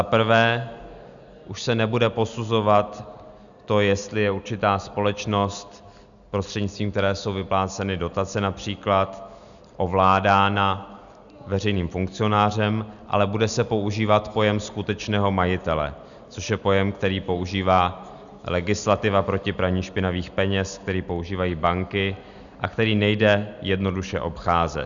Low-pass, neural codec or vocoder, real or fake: 7.2 kHz; none; real